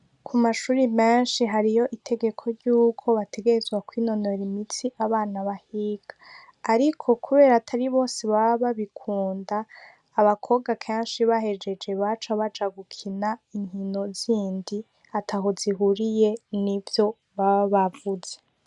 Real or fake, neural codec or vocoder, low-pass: real; none; 10.8 kHz